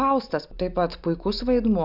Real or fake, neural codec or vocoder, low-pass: real; none; 5.4 kHz